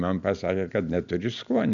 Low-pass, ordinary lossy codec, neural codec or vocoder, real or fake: 7.2 kHz; MP3, 64 kbps; none; real